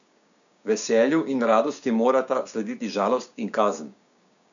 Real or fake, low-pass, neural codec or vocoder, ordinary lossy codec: fake; 7.2 kHz; codec, 16 kHz, 6 kbps, DAC; none